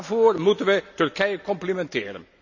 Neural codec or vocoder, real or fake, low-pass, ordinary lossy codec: none; real; 7.2 kHz; none